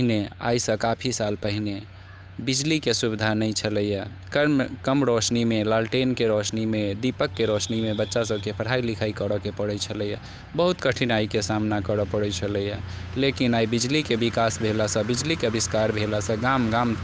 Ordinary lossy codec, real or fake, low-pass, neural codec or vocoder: none; fake; none; codec, 16 kHz, 8 kbps, FunCodec, trained on Chinese and English, 25 frames a second